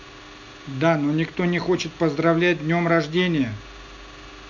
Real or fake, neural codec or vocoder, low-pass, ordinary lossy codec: real; none; 7.2 kHz; none